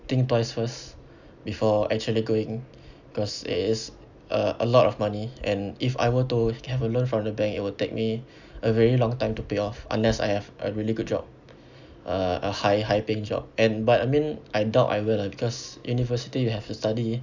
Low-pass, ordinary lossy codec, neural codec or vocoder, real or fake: 7.2 kHz; none; none; real